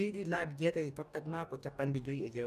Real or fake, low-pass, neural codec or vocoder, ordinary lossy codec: fake; 14.4 kHz; codec, 44.1 kHz, 2.6 kbps, DAC; none